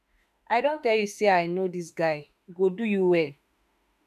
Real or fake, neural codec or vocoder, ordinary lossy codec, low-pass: fake; autoencoder, 48 kHz, 32 numbers a frame, DAC-VAE, trained on Japanese speech; none; 14.4 kHz